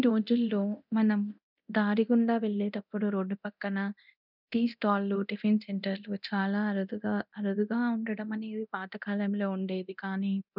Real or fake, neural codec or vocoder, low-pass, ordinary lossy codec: fake; codec, 24 kHz, 0.9 kbps, DualCodec; 5.4 kHz; none